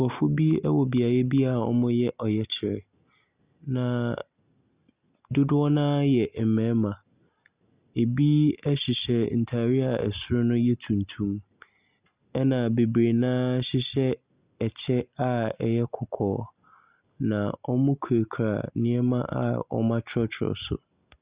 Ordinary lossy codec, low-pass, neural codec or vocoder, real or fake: Opus, 64 kbps; 3.6 kHz; none; real